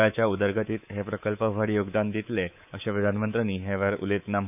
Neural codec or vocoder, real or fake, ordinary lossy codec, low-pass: codec, 24 kHz, 3.1 kbps, DualCodec; fake; none; 3.6 kHz